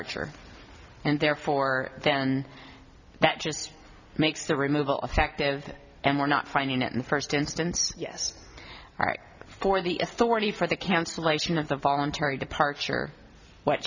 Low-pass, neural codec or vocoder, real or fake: 7.2 kHz; none; real